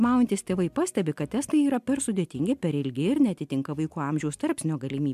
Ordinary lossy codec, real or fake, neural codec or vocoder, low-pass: MP3, 96 kbps; real; none; 14.4 kHz